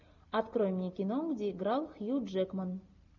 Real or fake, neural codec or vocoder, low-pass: real; none; 7.2 kHz